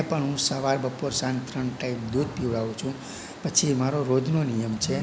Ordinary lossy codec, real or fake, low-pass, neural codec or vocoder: none; real; none; none